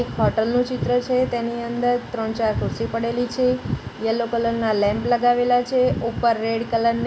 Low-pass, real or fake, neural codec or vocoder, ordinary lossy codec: none; real; none; none